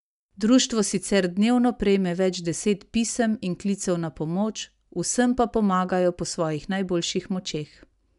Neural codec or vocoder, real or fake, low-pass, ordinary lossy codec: none; real; 10.8 kHz; none